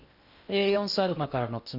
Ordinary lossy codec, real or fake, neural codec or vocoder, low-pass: none; fake; codec, 16 kHz in and 24 kHz out, 0.8 kbps, FocalCodec, streaming, 65536 codes; 5.4 kHz